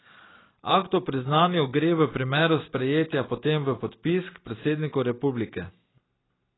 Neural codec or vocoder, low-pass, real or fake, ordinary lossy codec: none; 7.2 kHz; real; AAC, 16 kbps